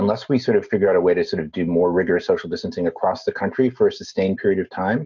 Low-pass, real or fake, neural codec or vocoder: 7.2 kHz; real; none